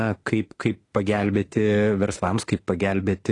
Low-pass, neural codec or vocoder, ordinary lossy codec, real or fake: 10.8 kHz; autoencoder, 48 kHz, 32 numbers a frame, DAC-VAE, trained on Japanese speech; AAC, 32 kbps; fake